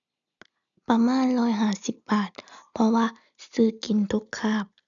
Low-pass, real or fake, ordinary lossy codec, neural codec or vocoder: 7.2 kHz; real; none; none